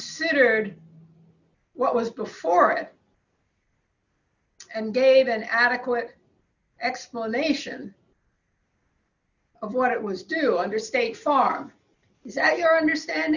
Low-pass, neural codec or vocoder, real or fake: 7.2 kHz; none; real